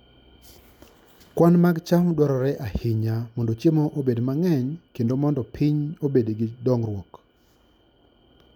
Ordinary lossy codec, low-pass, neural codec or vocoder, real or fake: none; 19.8 kHz; none; real